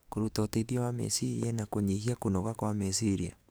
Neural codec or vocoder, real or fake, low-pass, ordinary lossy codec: codec, 44.1 kHz, 7.8 kbps, DAC; fake; none; none